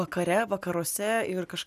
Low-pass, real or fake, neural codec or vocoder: 14.4 kHz; real; none